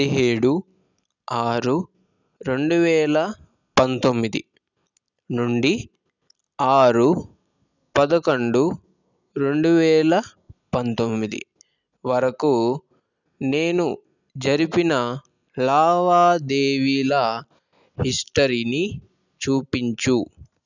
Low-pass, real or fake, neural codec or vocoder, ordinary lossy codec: 7.2 kHz; real; none; none